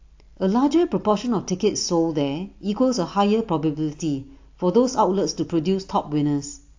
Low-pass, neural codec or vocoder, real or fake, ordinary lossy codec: 7.2 kHz; none; real; AAC, 48 kbps